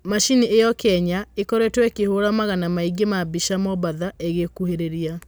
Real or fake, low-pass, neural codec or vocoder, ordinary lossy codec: real; none; none; none